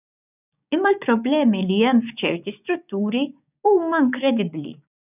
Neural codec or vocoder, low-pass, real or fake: codec, 16 kHz, 6 kbps, DAC; 3.6 kHz; fake